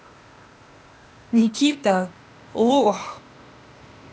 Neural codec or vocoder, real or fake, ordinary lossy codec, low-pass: codec, 16 kHz, 0.8 kbps, ZipCodec; fake; none; none